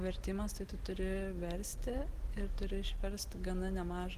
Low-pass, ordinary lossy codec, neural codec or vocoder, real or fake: 14.4 kHz; Opus, 16 kbps; none; real